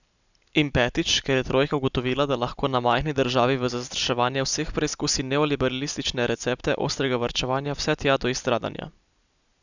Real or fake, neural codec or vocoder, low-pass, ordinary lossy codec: real; none; 7.2 kHz; none